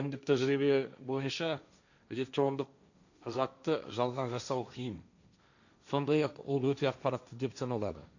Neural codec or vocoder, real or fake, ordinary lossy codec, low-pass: codec, 16 kHz, 1.1 kbps, Voila-Tokenizer; fake; none; 7.2 kHz